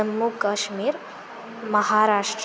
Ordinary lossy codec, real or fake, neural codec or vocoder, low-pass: none; real; none; none